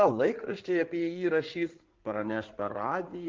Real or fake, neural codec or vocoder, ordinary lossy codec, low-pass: fake; codec, 16 kHz in and 24 kHz out, 2.2 kbps, FireRedTTS-2 codec; Opus, 16 kbps; 7.2 kHz